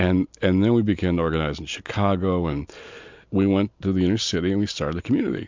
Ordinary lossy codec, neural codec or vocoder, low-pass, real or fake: MP3, 64 kbps; none; 7.2 kHz; real